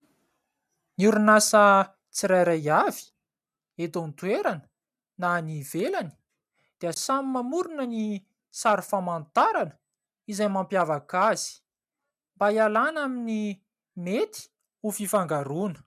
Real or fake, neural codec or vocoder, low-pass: real; none; 14.4 kHz